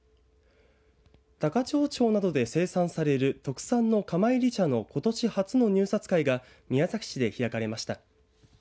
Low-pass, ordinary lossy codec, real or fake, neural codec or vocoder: none; none; real; none